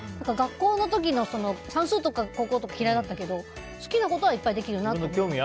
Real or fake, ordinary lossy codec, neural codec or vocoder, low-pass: real; none; none; none